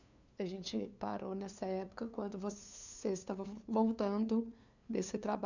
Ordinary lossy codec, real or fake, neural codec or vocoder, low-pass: none; fake; codec, 16 kHz, 2 kbps, FunCodec, trained on LibriTTS, 25 frames a second; 7.2 kHz